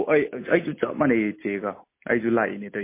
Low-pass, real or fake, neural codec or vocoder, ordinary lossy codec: 3.6 kHz; real; none; MP3, 24 kbps